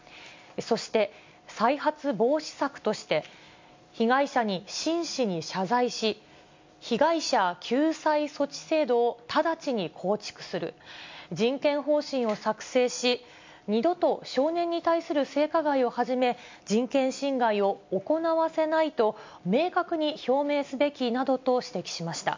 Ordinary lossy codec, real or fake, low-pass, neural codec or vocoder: MP3, 64 kbps; real; 7.2 kHz; none